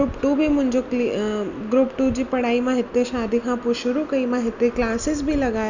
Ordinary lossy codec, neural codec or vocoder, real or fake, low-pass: none; none; real; 7.2 kHz